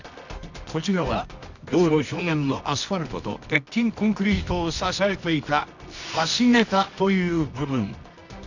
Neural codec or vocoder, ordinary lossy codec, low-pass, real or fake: codec, 24 kHz, 0.9 kbps, WavTokenizer, medium music audio release; none; 7.2 kHz; fake